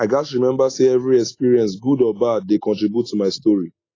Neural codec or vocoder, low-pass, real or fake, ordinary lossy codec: none; 7.2 kHz; real; AAC, 32 kbps